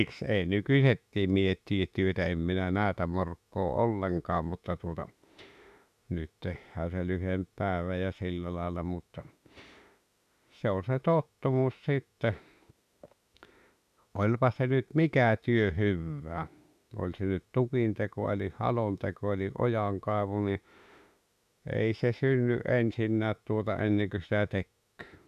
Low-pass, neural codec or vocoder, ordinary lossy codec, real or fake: 14.4 kHz; autoencoder, 48 kHz, 32 numbers a frame, DAC-VAE, trained on Japanese speech; none; fake